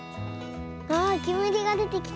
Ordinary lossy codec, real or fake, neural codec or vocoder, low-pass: none; real; none; none